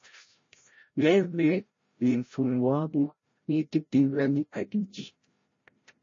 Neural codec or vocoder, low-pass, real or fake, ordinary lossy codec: codec, 16 kHz, 0.5 kbps, FreqCodec, larger model; 7.2 kHz; fake; MP3, 32 kbps